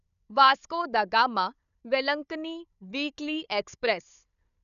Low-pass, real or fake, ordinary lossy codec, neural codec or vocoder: 7.2 kHz; fake; none; codec, 16 kHz, 4 kbps, FunCodec, trained on Chinese and English, 50 frames a second